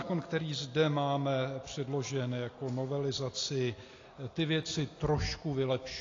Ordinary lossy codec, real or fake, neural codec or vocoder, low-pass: AAC, 32 kbps; real; none; 7.2 kHz